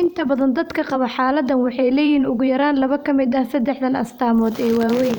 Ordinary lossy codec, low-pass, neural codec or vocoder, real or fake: none; none; vocoder, 44.1 kHz, 128 mel bands every 512 samples, BigVGAN v2; fake